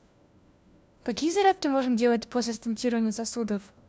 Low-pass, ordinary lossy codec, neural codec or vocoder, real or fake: none; none; codec, 16 kHz, 1 kbps, FunCodec, trained on LibriTTS, 50 frames a second; fake